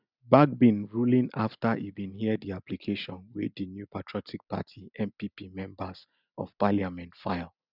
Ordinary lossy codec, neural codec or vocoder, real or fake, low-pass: none; none; real; 5.4 kHz